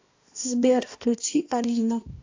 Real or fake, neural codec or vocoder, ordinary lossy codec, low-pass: fake; codec, 16 kHz, 1 kbps, X-Codec, HuBERT features, trained on balanced general audio; AAC, 48 kbps; 7.2 kHz